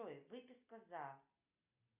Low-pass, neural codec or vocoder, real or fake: 3.6 kHz; none; real